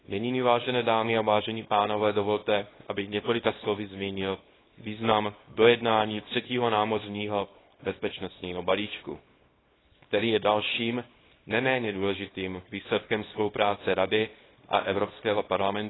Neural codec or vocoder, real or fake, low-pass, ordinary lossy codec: codec, 24 kHz, 0.9 kbps, WavTokenizer, small release; fake; 7.2 kHz; AAC, 16 kbps